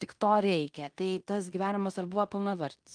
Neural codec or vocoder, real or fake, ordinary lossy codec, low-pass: codec, 16 kHz in and 24 kHz out, 0.9 kbps, LongCat-Audio-Codec, fine tuned four codebook decoder; fake; Opus, 32 kbps; 9.9 kHz